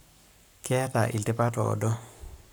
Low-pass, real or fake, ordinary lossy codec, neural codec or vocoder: none; fake; none; codec, 44.1 kHz, 7.8 kbps, Pupu-Codec